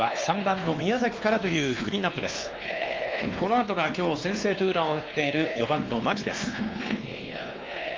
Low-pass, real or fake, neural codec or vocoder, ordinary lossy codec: 7.2 kHz; fake; codec, 16 kHz, 2 kbps, X-Codec, WavLM features, trained on Multilingual LibriSpeech; Opus, 24 kbps